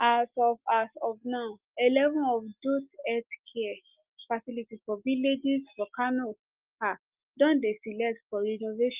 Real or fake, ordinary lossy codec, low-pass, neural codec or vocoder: real; Opus, 32 kbps; 3.6 kHz; none